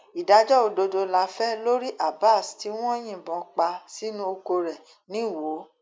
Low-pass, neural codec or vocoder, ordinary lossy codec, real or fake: none; none; none; real